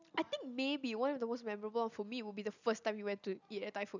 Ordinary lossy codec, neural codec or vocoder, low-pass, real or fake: none; none; 7.2 kHz; real